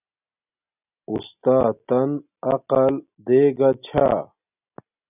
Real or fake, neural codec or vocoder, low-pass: real; none; 3.6 kHz